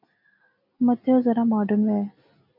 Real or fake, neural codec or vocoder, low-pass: real; none; 5.4 kHz